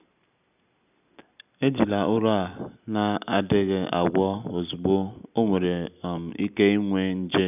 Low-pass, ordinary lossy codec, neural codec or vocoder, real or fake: 3.6 kHz; none; none; real